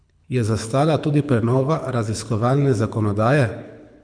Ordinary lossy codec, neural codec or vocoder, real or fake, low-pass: none; codec, 24 kHz, 6 kbps, HILCodec; fake; 9.9 kHz